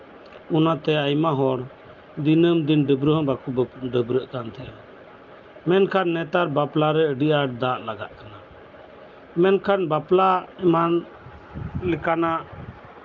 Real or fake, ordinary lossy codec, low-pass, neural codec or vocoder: real; Opus, 32 kbps; 7.2 kHz; none